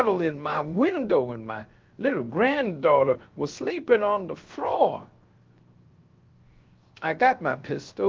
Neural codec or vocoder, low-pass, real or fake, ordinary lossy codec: codec, 16 kHz, 0.7 kbps, FocalCodec; 7.2 kHz; fake; Opus, 32 kbps